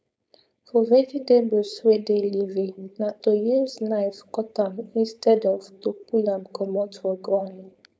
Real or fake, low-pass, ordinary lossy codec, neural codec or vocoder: fake; none; none; codec, 16 kHz, 4.8 kbps, FACodec